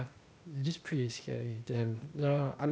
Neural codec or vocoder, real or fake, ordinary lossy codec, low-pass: codec, 16 kHz, 0.8 kbps, ZipCodec; fake; none; none